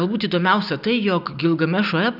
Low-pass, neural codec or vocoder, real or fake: 5.4 kHz; none; real